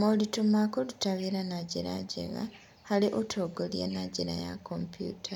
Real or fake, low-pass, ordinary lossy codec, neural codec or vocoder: real; 19.8 kHz; none; none